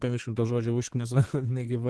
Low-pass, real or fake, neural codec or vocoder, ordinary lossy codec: 10.8 kHz; fake; codec, 32 kHz, 1.9 kbps, SNAC; Opus, 16 kbps